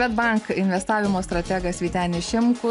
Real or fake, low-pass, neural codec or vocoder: real; 10.8 kHz; none